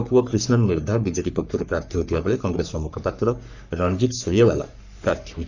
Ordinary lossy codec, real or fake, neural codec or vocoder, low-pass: none; fake; codec, 44.1 kHz, 3.4 kbps, Pupu-Codec; 7.2 kHz